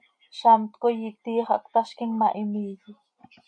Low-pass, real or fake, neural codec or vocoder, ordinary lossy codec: 9.9 kHz; real; none; MP3, 64 kbps